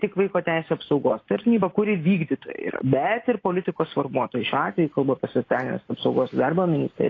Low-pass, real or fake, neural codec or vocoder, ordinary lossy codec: 7.2 kHz; real; none; AAC, 32 kbps